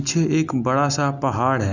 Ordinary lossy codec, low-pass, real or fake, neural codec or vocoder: none; 7.2 kHz; real; none